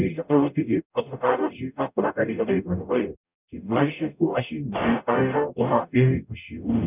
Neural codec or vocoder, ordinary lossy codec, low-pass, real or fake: codec, 44.1 kHz, 0.9 kbps, DAC; none; 3.6 kHz; fake